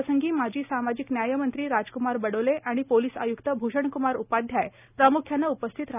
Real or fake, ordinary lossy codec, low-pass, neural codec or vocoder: real; none; 3.6 kHz; none